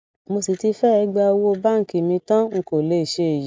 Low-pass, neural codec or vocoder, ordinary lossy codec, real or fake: none; none; none; real